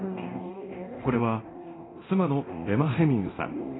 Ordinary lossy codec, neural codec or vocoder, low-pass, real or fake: AAC, 16 kbps; codec, 24 kHz, 0.9 kbps, DualCodec; 7.2 kHz; fake